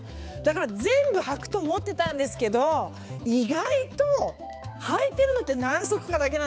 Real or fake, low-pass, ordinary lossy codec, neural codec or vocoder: fake; none; none; codec, 16 kHz, 4 kbps, X-Codec, HuBERT features, trained on balanced general audio